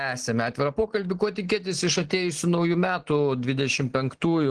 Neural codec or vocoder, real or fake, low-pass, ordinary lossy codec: none; real; 9.9 kHz; Opus, 16 kbps